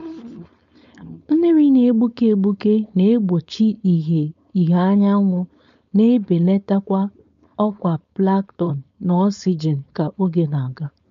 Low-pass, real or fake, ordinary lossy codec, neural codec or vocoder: 7.2 kHz; fake; MP3, 48 kbps; codec, 16 kHz, 4.8 kbps, FACodec